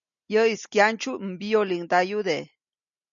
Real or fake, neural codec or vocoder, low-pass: real; none; 7.2 kHz